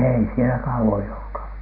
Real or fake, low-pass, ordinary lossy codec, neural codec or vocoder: real; 5.4 kHz; none; none